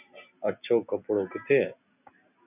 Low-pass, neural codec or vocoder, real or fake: 3.6 kHz; none; real